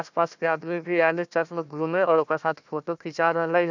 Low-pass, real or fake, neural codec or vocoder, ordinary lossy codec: 7.2 kHz; fake; codec, 16 kHz, 1 kbps, FunCodec, trained on Chinese and English, 50 frames a second; none